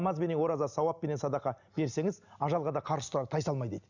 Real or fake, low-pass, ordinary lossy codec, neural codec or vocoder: real; 7.2 kHz; none; none